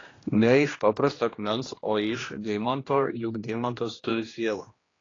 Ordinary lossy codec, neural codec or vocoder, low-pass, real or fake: AAC, 32 kbps; codec, 16 kHz, 1 kbps, X-Codec, HuBERT features, trained on general audio; 7.2 kHz; fake